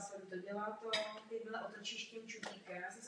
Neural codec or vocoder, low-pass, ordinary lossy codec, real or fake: none; 9.9 kHz; AAC, 48 kbps; real